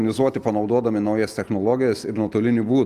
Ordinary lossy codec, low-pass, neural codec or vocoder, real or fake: Opus, 32 kbps; 14.4 kHz; none; real